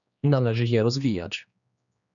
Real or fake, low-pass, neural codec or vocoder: fake; 7.2 kHz; codec, 16 kHz, 2 kbps, X-Codec, HuBERT features, trained on general audio